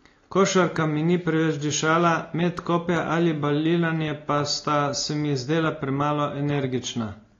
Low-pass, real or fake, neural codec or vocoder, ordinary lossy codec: 7.2 kHz; real; none; AAC, 32 kbps